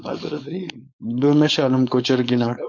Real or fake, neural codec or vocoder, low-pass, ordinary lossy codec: fake; codec, 16 kHz, 4.8 kbps, FACodec; 7.2 kHz; MP3, 48 kbps